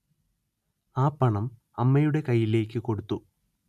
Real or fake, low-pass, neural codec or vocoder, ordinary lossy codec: real; 14.4 kHz; none; none